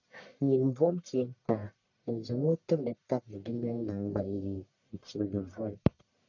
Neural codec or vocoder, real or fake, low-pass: codec, 44.1 kHz, 1.7 kbps, Pupu-Codec; fake; 7.2 kHz